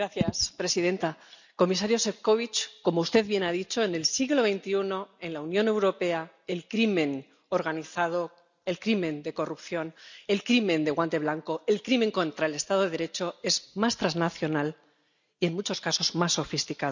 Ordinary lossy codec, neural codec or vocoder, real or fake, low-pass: none; none; real; 7.2 kHz